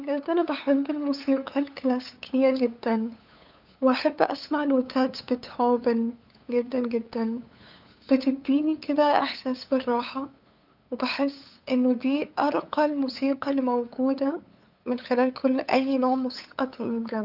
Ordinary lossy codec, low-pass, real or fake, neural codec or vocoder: none; 5.4 kHz; fake; codec, 16 kHz, 8 kbps, FunCodec, trained on LibriTTS, 25 frames a second